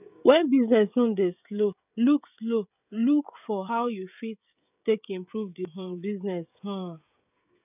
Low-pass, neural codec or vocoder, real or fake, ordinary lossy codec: 3.6 kHz; codec, 16 kHz, 16 kbps, FreqCodec, smaller model; fake; none